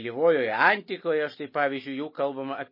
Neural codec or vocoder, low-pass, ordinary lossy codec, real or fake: none; 5.4 kHz; MP3, 24 kbps; real